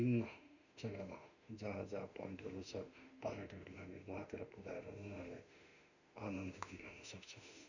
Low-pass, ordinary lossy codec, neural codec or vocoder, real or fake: 7.2 kHz; none; autoencoder, 48 kHz, 32 numbers a frame, DAC-VAE, trained on Japanese speech; fake